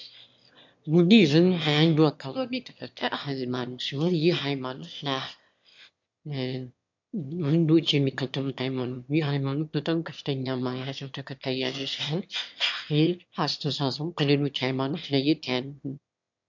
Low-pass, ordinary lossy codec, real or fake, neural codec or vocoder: 7.2 kHz; MP3, 64 kbps; fake; autoencoder, 22.05 kHz, a latent of 192 numbers a frame, VITS, trained on one speaker